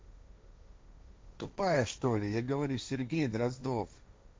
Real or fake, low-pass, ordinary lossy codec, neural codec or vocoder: fake; none; none; codec, 16 kHz, 1.1 kbps, Voila-Tokenizer